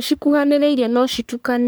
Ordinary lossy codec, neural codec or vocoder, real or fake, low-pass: none; codec, 44.1 kHz, 3.4 kbps, Pupu-Codec; fake; none